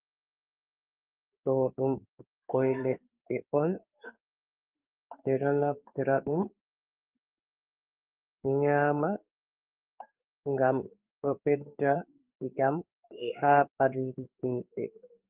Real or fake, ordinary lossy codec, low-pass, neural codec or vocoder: fake; Opus, 24 kbps; 3.6 kHz; codec, 16 kHz in and 24 kHz out, 1 kbps, XY-Tokenizer